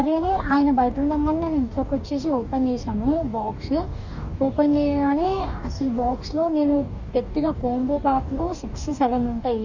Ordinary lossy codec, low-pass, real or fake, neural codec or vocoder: none; 7.2 kHz; fake; codec, 44.1 kHz, 2.6 kbps, DAC